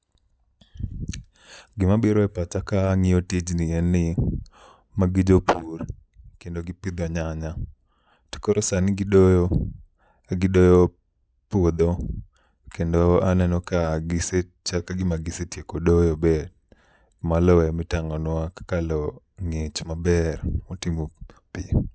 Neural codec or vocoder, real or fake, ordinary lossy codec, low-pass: none; real; none; none